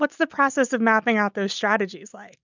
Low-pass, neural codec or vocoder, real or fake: 7.2 kHz; none; real